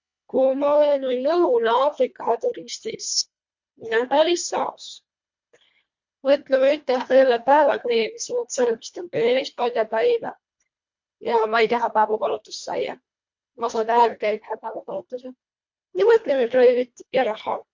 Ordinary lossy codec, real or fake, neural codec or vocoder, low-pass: MP3, 48 kbps; fake; codec, 24 kHz, 1.5 kbps, HILCodec; 7.2 kHz